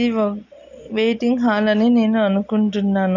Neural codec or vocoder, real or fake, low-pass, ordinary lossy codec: none; real; 7.2 kHz; none